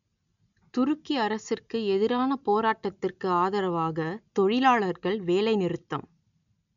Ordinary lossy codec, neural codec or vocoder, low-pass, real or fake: none; none; 7.2 kHz; real